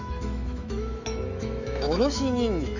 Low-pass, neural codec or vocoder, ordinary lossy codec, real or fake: 7.2 kHz; autoencoder, 48 kHz, 128 numbers a frame, DAC-VAE, trained on Japanese speech; none; fake